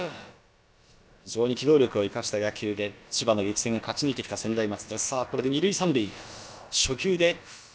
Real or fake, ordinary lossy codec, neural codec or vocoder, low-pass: fake; none; codec, 16 kHz, about 1 kbps, DyCAST, with the encoder's durations; none